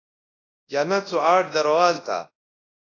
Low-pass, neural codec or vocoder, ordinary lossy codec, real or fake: 7.2 kHz; codec, 24 kHz, 0.9 kbps, WavTokenizer, large speech release; AAC, 32 kbps; fake